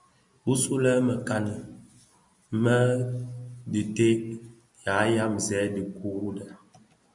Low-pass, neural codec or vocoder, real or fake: 10.8 kHz; none; real